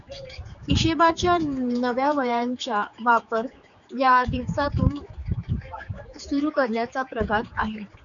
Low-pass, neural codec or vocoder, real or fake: 7.2 kHz; codec, 16 kHz, 4 kbps, X-Codec, HuBERT features, trained on general audio; fake